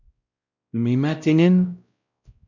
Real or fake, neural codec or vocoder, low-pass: fake; codec, 16 kHz, 0.5 kbps, X-Codec, WavLM features, trained on Multilingual LibriSpeech; 7.2 kHz